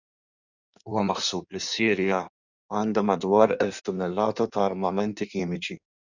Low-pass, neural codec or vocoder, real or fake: 7.2 kHz; codec, 16 kHz in and 24 kHz out, 1.1 kbps, FireRedTTS-2 codec; fake